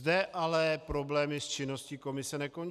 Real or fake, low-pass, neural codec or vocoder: real; 14.4 kHz; none